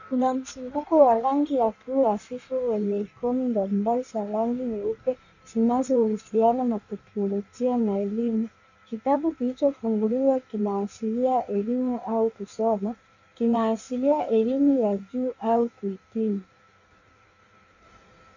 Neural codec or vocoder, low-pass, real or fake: codec, 16 kHz in and 24 kHz out, 1.1 kbps, FireRedTTS-2 codec; 7.2 kHz; fake